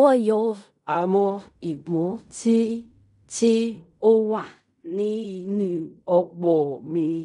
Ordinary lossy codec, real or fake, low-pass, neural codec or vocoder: none; fake; 10.8 kHz; codec, 16 kHz in and 24 kHz out, 0.4 kbps, LongCat-Audio-Codec, fine tuned four codebook decoder